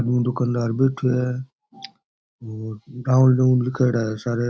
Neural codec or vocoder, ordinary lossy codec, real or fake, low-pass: none; none; real; none